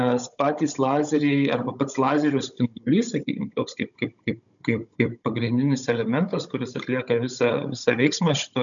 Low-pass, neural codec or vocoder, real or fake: 7.2 kHz; codec, 16 kHz, 16 kbps, FunCodec, trained on Chinese and English, 50 frames a second; fake